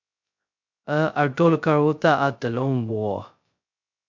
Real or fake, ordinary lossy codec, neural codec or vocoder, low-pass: fake; MP3, 64 kbps; codec, 16 kHz, 0.2 kbps, FocalCodec; 7.2 kHz